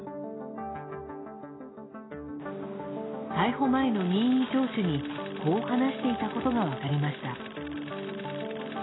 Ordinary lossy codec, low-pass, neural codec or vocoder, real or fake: AAC, 16 kbps; 7.2 kHz; none; real